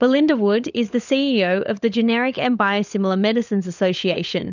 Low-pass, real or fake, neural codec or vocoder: 7.2 kHz; fake; codec, 16 kHz, 16 kbps, FunCodec, trained on LibriTTS, 50 frames a second